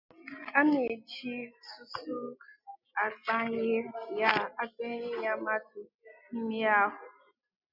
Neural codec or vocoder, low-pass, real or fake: none; 5.4 kHz; real